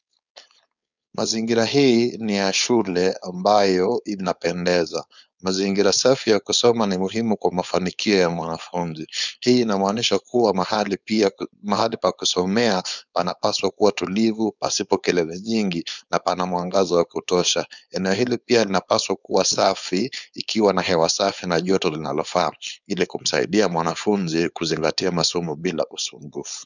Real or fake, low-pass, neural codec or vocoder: fake; 7.2 kHz; codec, 16 kHz, 4.8 kbps, FACodec